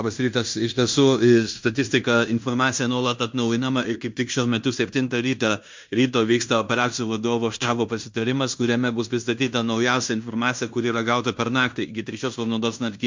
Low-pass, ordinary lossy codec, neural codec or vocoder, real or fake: 7.2 kHz; MP3, 64 kbps; codec, 16 kHz in and 24 kHz out, 0.9 kbps, LongCat-Audio-Codec, fine tuned four codebook decoder; fake